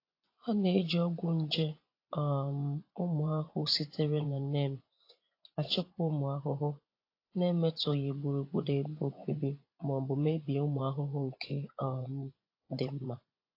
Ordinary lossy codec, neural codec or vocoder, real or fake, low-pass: AAC, 32 kbps; vocoder, 24 kHz, 100 mel bands, Vocos; fake; 5.4 kHz